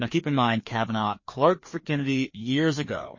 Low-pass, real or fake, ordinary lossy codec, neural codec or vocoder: 7.2 kHz; fake; MP3, 32 kbps; codec, 16 kHz, 2 kbps, FreqCodec, larger model